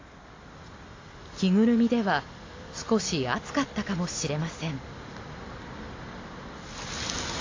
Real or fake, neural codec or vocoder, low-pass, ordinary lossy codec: real; none; 7.2 kHz; AAC, 32 kbps